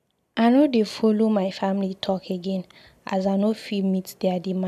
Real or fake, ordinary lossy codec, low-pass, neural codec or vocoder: real; AAC, 96 kbps; 14.4 kHz; none